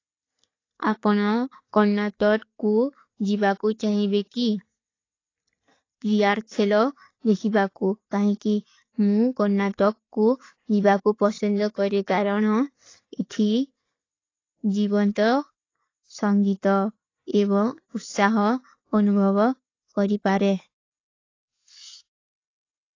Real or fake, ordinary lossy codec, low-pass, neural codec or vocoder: real; AAC, 32 kbps; 7.2 kHz; none